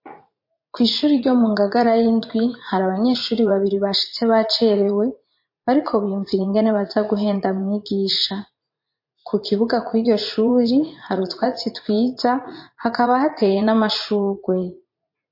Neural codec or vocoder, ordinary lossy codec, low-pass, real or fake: vocoder, 44.1 kHz, 80 mel bands, Vocos; MP3, 32 kbps; 5.4 kHz; fake